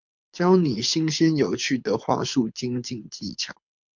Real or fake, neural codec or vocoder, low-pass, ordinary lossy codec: fake; codec, 24 kHz, 6 kbps, HILCodec; 7.2 kHz; MP3, 64 kbps